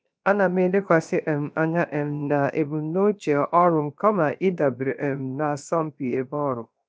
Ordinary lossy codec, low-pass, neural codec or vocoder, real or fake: none; none; codec, 16 kHz, 0.7 kbps, FocalCodec; fake